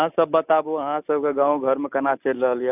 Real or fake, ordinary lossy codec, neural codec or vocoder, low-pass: real; none; none; 3.6 kHz